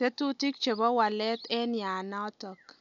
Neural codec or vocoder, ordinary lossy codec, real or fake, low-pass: none; none; real; 7.2 kHz